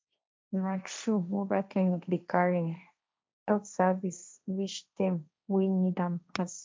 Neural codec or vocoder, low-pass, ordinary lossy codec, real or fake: codec, 16 kHz, 1.1 kbps, Voila-Tokenizer; none; none; fake